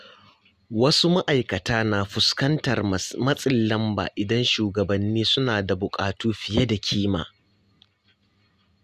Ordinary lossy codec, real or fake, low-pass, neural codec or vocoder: Opus, 64 kbps; real; 14.4 kHz; none